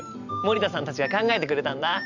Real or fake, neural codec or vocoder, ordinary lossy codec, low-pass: fake; vocoder, 44.1 kHz, 128 mel bands every 512 samples, BigVGAN v2; none; 7.2 kHz